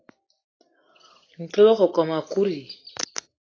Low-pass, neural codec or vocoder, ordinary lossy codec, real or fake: 7.2 kHz; none; AAC, 32 kbps; real